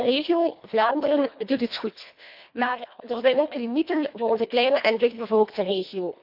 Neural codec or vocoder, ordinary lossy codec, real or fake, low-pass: codec, 24 kHz, 1.5 kbps, HILCodec; MP3, 48 kbps; fake; 5.4 kHz